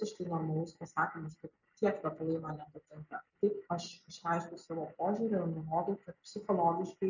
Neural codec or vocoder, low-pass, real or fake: none; 7.2 kHz; real